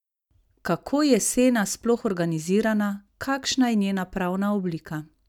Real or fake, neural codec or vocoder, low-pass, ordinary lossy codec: real; none; 19.8 kHz; none